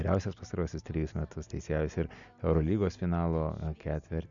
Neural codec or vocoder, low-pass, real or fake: none; 7.2 kHz; real